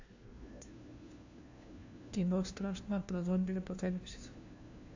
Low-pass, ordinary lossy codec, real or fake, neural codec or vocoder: 7.2 kHz; AAC, 48 kbps; fake; codec, 16 kHz, 1 kbps, FunCodec, trained on LibriTTS, 50 frames a second